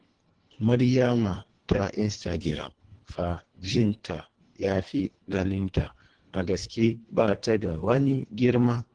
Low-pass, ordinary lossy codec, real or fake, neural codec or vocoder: 9.9 kHz; Opus, 16 kbps; fake; codec, 24 kHz, 1.5 kbps, HILCodec